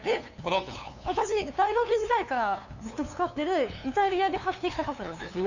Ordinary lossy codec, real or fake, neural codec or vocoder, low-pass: none; fake; codec, 16 kHz, 2 kbps, FunCodec, trained on LibriTTS, 25 frames a second; 7.2 kHz